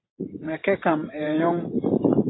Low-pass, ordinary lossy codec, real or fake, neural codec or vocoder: 7.2 kHz; AAC, 16 kbps; fake; vocoder, 44.1 kHz, 128 mel bands every 512 samples, BigVGAN v2